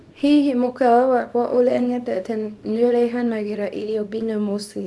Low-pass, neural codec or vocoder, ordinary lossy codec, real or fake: none; codec, 24 kHz, 0.9 kbps, WavTokenizer, small release; none; fake